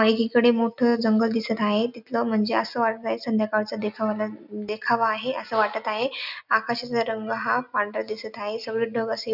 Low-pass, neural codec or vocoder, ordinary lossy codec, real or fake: 5.4 kHz; none; none; real